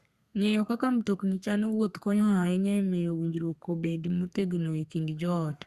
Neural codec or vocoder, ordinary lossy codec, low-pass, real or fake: codec, 32 kHz, 1.9 kbps, SNAC; Opus, 64 kbps; 14.4 kHz; fake